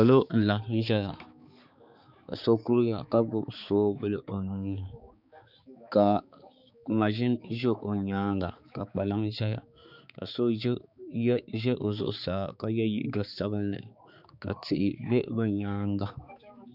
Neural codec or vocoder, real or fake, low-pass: codec, 16 kHz, 4 kbps, X-Codec, HuBERT features, trained on balanced general audio; fake; 5.4 kHz